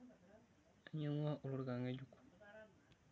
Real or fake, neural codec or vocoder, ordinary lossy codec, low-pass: real; none; none; none